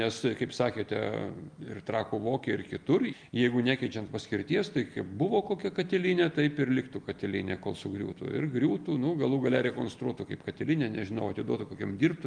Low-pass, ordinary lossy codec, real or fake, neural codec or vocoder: 9.9 kHz; Opus, 32 kbps; real; none